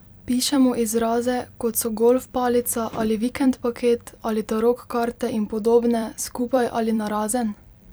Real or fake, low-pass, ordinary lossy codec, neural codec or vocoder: fake; none; none; vocoder, 44.1 kHz, 128 mel bands every 256 samples, BigVGAN v2